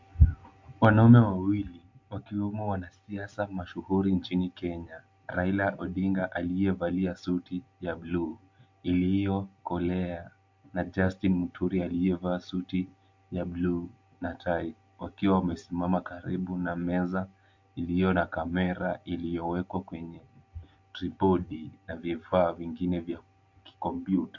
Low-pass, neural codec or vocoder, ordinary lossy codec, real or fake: 7.2 kHz; none; MP3, 48 kbps; real